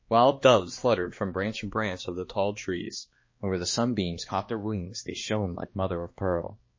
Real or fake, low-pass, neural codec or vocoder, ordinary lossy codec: fake; 7.2 kHz; codec, 16 kHz, 2 kbps, X-Codec, HuBERT features, trained on balanced general audio; MP3, 32 kbps